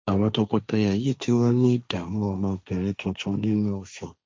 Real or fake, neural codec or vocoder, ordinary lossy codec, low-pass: fake; codec, 16 kHz, 1.1 kbps, Voila-Tokenizer; none; none